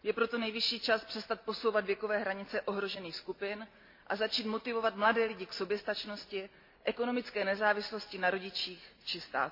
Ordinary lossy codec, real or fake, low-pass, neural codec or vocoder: MP3, 32 kbps; real; 5.4 kHz; none